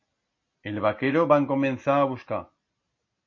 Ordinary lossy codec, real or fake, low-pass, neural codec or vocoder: MP3, 32 kbps; real; 7.2 kHz; none